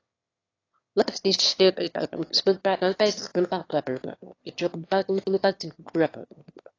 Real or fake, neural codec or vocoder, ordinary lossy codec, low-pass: fake; autoencoder, 22.05 kHz, a latent of 192 numbers a frame, VITS, trained on one speaker; AAC, 32 kbps; 7.2 kHz